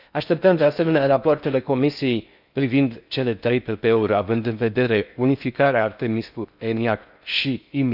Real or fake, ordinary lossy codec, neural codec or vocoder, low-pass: fake; none; codec, 16 kHz in and 24 kHz out, 0.6 kbps, FocalCodec, streaming, 4096 codes; 5.4 kHz